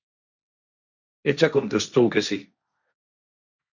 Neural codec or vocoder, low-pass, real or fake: codec, 16 kHz, 1.1 kbps, Voila-Tokenizer; 7.2 kHz; fake